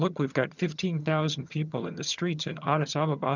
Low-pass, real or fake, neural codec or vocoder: 7.2 kHz; fake; vocoder, 22.05 kHz, 80 mel bands, HiFi-GAN